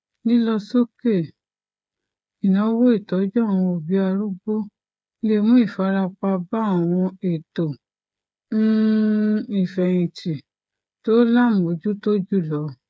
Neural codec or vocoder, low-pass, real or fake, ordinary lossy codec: codec, 16 kHz, 8 kbps, FreqCodec, smaller model; none; fake; none